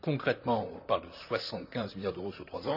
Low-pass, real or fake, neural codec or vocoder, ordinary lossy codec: 5.4 kHz; fake; vocoder, 44.1 kHz, 128 mel bands, Pupu-Vocoder; none